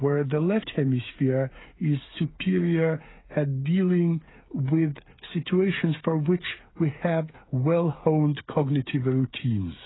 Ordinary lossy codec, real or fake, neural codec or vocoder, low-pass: AAC, 16 kbps; fake; codec, 16 kHz, 8 kbps, FreqCodec, smaller model; 7.2 kHz